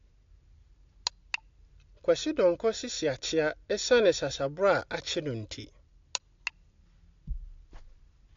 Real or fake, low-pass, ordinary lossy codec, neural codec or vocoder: real; 7.2 kHz; AAC, 48 kbps; none